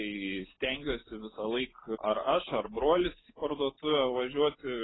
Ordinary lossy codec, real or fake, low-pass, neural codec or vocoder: AAC, 16 kbps; real; 7.2 kHz; none